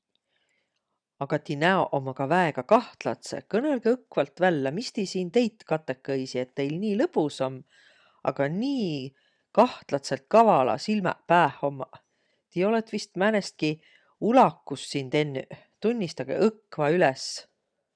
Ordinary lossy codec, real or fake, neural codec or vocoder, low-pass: none; real; none; 9.9 kHz